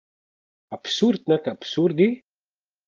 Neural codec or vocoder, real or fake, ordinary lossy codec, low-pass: none; real; Opus, 32 kbps; 7.2 kHz